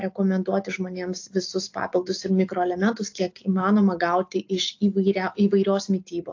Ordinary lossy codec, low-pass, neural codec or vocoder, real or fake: AAC, 48 kbps; 7.2 kHz; none; real